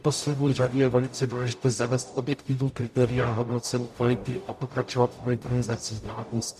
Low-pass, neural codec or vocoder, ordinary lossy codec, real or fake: 14.4 kHz; codec, 44.1 kHz, 0.9 kbps, DAC; MP3, 96 kbps; fake